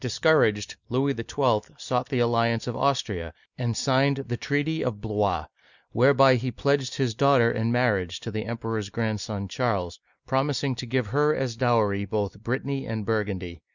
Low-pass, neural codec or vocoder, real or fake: 7.2 kHz; none; real